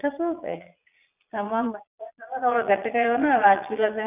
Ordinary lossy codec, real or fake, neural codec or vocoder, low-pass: none; fake; vocoder, 22.05 kHz, 80 mel bands, Vocos; 3.6 kHz